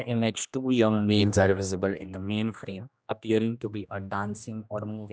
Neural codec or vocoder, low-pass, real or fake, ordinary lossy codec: codec, 16 kHz, 1 kbps, X-Codec, HuBERT features, trained on general audio; none; fake; none